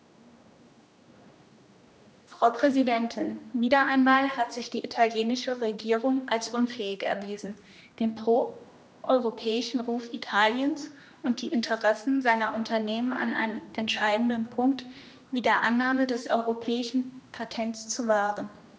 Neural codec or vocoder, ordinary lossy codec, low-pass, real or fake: codec, 16 kHz, 1 kbps, X-Codec, HuBERT features, trained on general audio; none; none; fake